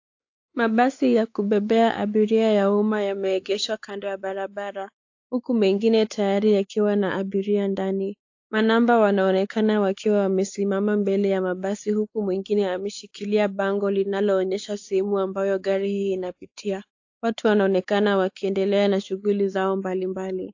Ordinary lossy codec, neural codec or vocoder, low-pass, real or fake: AAC, 48 kbps; codec, 16 kHz, 4 kbps, X-Codec, WavLM features, trained on Multilingual LibriSpeech; 7.2 kHz; fake